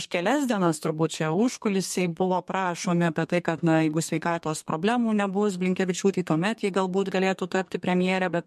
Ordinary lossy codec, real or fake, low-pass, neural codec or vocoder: MP3, 64 kbps; fake; 14.4 kHz; codec, 32 kHz, 1.9 kbps, SNAC